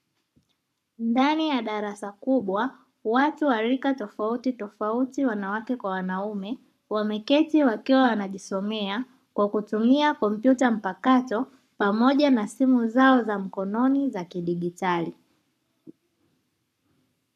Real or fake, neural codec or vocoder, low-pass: fake; codec, 44.1 kHz, 7.8 kbps, Pupu-Codec; 14.4 kHz